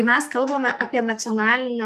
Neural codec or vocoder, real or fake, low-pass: codec, 44.1 kHz, 2.6 kbps, SNAC; fake; 14.4 kHz